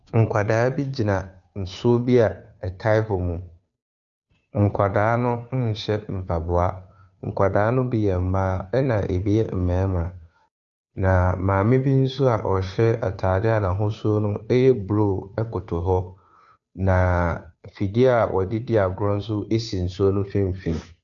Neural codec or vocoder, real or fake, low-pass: codec, 16 kHz, 2 kbps, FunCodec, trained on Chinese and English, 25 frames a second; fake; 7.2 kHz